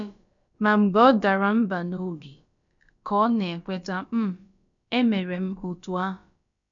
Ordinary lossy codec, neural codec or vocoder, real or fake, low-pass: none; codec, 16 kHz, about 1 kbps, DyCAST, with the encoder's durations; fake; 7.2 kHz